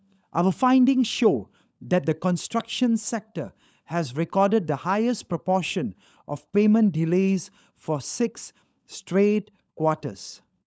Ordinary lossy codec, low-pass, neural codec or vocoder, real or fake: none; none; codec, 16 kHz, 16 kbps, FunCodec, trained on LibriTTS, 50 frames a second; fake